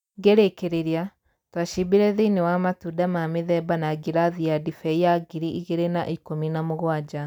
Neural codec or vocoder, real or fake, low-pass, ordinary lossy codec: none; real; 19.8 kHz; none